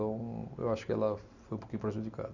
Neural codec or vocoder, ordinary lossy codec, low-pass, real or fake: none; none; 7.2 kHz; real